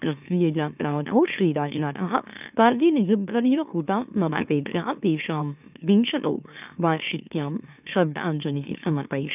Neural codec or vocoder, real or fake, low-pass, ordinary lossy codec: autoencoder, 44.1 kHz, a latent of 192 numbers a frame, MeloTTS; fake; 3.6 kHz; none